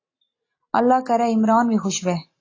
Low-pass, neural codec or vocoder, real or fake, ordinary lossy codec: 7.2 kHz; none; real; AAC, 32 kbps